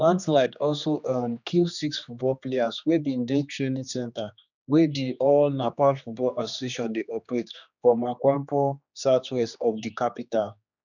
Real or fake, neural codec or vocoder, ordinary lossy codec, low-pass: fake; codec, 16 kHz, 2 kbps, X-Codec, HuBERT features, trained on general audio; none; 7.2 kHz